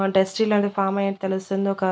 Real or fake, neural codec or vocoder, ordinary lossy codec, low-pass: real; none; none; none